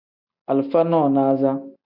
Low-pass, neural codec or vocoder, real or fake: 5.4 kHz; none; real